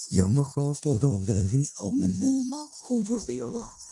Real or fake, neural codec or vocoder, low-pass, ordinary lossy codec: fake; codec, 16 kHz in and 24 kHz out, 0.4 kbps, LongCat-Audio-Codec, four codebook decoder; 10.8 kHz; none